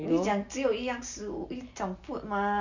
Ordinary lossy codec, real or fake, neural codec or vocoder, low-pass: none; real; none; 7.2 kHz